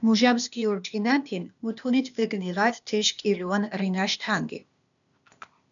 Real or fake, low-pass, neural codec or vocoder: fake; 7.2 kHz; codec, 16 kHz, 0.8 kbps, ZipCodec